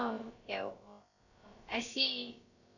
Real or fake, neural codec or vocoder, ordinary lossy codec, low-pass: fake; codec, 16 kHz, about 1 kbps, DyCAST, with the encoder's durations; none; 7.2 kHz